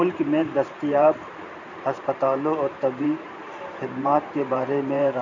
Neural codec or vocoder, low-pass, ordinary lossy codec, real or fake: vocoder, 44.1 kHz, 128 mel bands every 512 samples, BigVGAN v2; 7.2 kHz; none; fake